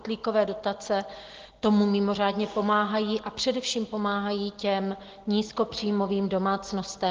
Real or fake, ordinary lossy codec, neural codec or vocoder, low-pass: real; Opus, 16 kbps; none; 7.2 kHz